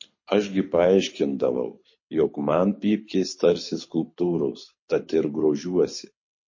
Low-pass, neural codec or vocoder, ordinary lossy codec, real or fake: 7.2 kHz; codec, 24 kHz, 6 kbps, HILCodec; MP3, 32 kbps; fake